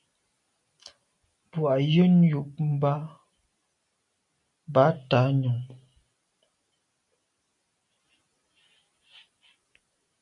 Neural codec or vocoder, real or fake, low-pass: none; real; 10.8 kHz